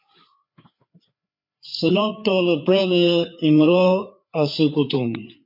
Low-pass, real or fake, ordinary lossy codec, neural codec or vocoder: 5.4 kHz; fake; MP3, 48 kbps; codec, 16 kHz, 4 kbps, FreqCodec, larger model